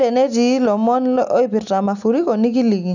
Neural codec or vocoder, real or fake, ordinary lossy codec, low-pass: none; real; none; 7.2 kHz